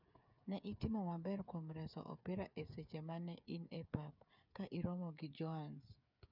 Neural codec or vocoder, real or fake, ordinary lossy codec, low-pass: codec, 16 kHz, 8 kbps, FreqCodec, smaller model; fake; none; 5.4 kHz